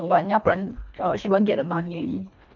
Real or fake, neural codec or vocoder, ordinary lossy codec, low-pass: fake; codec, 24 kHz, 1.5 kbps, HILCodec; none; 7.2 kHz